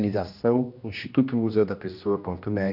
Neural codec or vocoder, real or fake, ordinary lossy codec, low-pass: codec, 16 kHz, 1 kbps, X-Codec, HuBERT features, trained on general audio; fake; none; 5.4 kHz